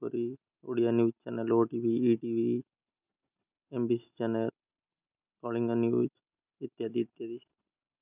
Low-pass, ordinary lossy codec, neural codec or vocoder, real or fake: 3.6 kHz; none; none; real